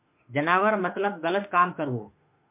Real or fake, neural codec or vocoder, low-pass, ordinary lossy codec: fake; autoencoder, 48 kHz, 32 numbers a frame, DAC-VAE, trained on Japanese speech; 3.6 kHz; MP3, 32 kbps